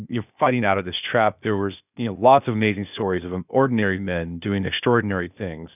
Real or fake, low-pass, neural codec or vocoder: fake; 3.6 kHz; codec, 16 kHz, 0.8 kbps, ZipCodec